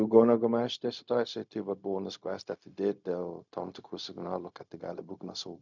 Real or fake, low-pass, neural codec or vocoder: fake; 7.2 kHz; codec, 16 kHz, 0.4 kbps, LongCat-Audio-Codec